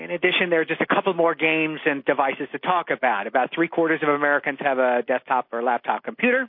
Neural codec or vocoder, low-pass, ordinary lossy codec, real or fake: none; 5.4 kHz; MP3, 32 kbps; real